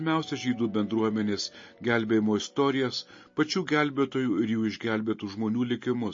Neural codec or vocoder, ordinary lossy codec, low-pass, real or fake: none; MP3, 32 kbps; 7.2 kHz; real